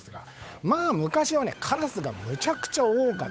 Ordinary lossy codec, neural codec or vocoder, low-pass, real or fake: none; codec, 16 kHz, 8 kbps, FunCodec, trained on Chinese and English, 25 frames a second; none; fake